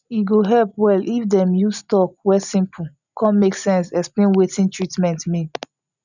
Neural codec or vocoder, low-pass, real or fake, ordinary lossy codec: none; 7.2 kHz; real; none